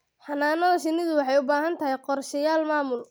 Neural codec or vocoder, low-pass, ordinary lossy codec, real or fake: none; none; none; real